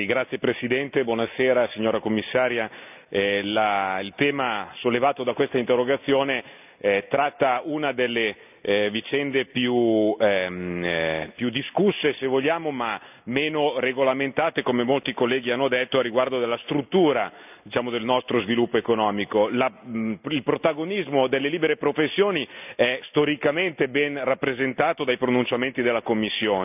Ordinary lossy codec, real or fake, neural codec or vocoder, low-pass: none; real; none; 3.6 kHz